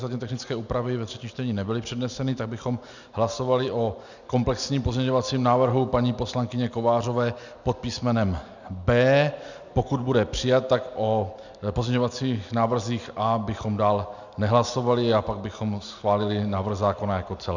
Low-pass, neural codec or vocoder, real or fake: 7.2 kHz; none; real